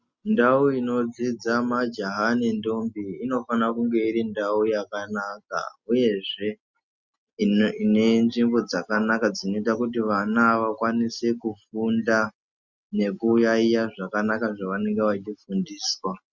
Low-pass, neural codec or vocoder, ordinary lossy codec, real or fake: 7.2 kHz; none; Opus, 64 kbps; real